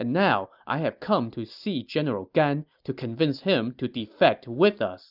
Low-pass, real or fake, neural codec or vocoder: 5.4 kHz; real; none